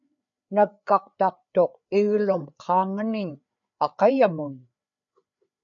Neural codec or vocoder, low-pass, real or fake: codec, 16 kHz, 4 kbps, FreqCodec, larger model; 7.2 kHz; fake